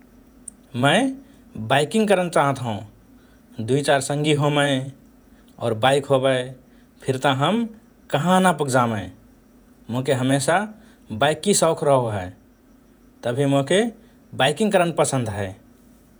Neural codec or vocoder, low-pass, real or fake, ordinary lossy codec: vocoder, 48 kHz, 128 mel bands, Vocos; none; fake; none